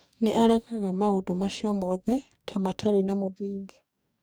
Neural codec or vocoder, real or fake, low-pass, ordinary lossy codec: codec, 44.1 kHz, 2.6 kbps, DAC; fake; none; none